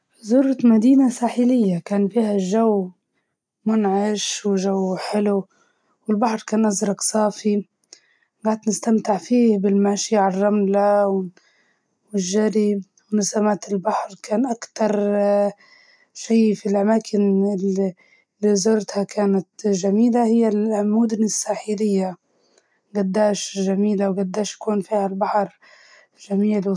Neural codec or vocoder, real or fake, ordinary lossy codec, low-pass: none; real; none; 9.9 kHz